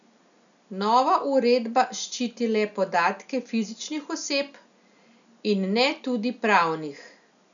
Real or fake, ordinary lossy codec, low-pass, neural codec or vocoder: real; none; 7.2 kHz; none